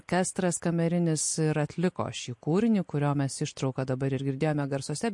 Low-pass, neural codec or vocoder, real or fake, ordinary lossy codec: 10.8 kHz; none; real; MP3, 48 kbps